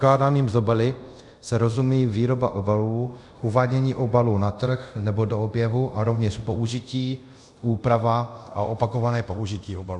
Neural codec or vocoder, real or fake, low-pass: codec, 24 kHz, 0.5 kbps, DualCodec; fake; 10.8 kHz